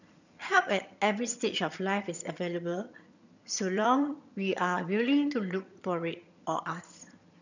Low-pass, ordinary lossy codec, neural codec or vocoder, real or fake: 7.2 kHz; none; vocoder, 22.05 kHz, 80 mel bands, HiFi-GAN; fake